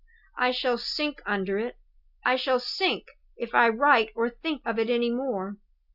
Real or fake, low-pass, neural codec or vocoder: real; 5.4 kHz; none